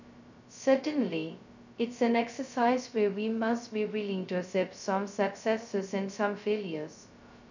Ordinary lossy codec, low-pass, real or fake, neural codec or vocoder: none; 7.2 kHz; fake; codec, 16 kHz, 0.2 kbps, FocalCodec